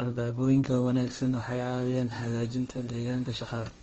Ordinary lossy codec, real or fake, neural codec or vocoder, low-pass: Opus, 24 kbps; fake; codec, 16 kHz, 1.1 kbps, Voila-Tokenizer; 7.2 kHz